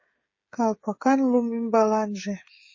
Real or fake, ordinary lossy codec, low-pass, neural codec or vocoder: fake; MP3, 32 kbps; 7.2 kHz; codec, 16 kHz, 16 kbps, FreqCodec, smaller model